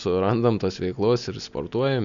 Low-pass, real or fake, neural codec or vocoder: 7.2 kHz; real; none